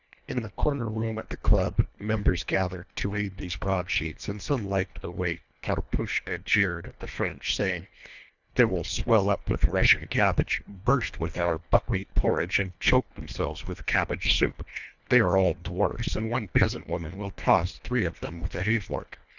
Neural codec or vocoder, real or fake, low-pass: codec, 24 kHz, 1.5 kbps, HILCodec; fake; 7.2 kHz